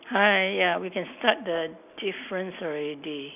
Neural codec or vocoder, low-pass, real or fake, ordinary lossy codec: none; 3.6 kHz; real; none